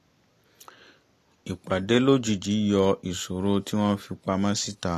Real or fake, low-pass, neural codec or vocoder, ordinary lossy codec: real; 14.4 kHz; none; AAC, 48 kbps